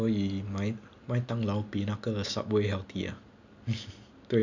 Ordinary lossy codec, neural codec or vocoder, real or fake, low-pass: none; none; real; 7.2 kHz